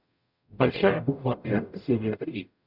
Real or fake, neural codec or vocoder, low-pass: fake; codec, 44.1 kHz, 0.9 kbps, DAC; 5.4 kHz